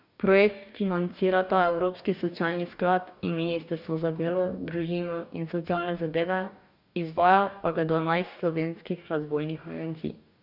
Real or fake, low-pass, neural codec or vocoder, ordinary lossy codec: fake; 5.4 kHz; codec, 44.1 kHz, 2.6 kbps, DAC; none